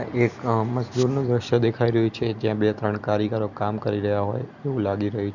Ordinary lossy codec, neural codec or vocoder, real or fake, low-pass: none; none; real; 7.2 kHz